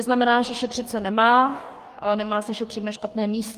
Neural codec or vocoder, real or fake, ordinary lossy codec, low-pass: codec, 44.1 kHz, 2.6 kbps, DAC; fake; Opus, 32 kbps; 14.4 kHz